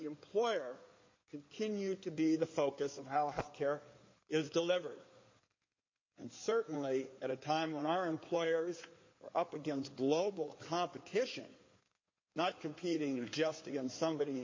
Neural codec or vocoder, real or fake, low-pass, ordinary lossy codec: codec, 16 kHz in and 24 kHz out, 2.2 kbps, FireRedTTS-2 codec; fake; 7.2 kHz; MP3, 32 kbps